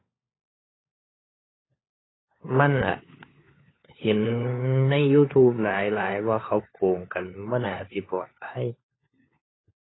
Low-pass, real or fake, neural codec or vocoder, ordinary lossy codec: 7.2 kHz; fake; codec, 16 kHz, 4 kbps, FunCodec, trained on LibriTTS, 50 frames a second; AAC, 16 kbps